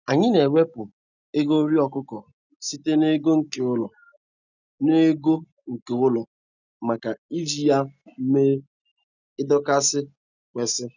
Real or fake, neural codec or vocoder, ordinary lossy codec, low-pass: real; none; none; 7.2 kHz